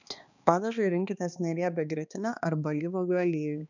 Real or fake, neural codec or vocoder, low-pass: fake; codec, 16 kHz, 2 kbps, X-Codec, HuBERT features, trained on balanced general audio; 7.2 kHz